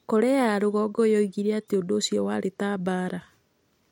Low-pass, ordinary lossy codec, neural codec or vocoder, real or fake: 19.8 kHz; MP3, 64 kbps; none; real